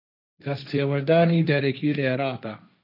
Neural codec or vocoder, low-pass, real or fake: codec, 16 kHz, 1.1 kbps, Voila-Tokenizer; 5.4 kHz; fake